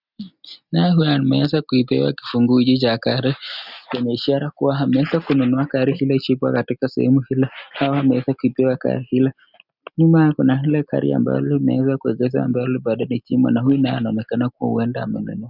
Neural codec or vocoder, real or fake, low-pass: none; real; 5.4 kHz